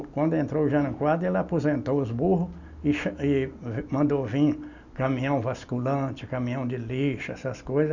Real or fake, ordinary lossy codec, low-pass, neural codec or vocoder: real; none; 7.2 kHz; none